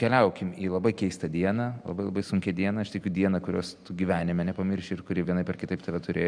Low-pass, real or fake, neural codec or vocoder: 9.9 kHz; real; none